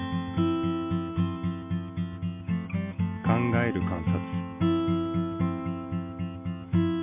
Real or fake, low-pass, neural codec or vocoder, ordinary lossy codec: real; 3.6 kHz; none; MP3, 32 kbps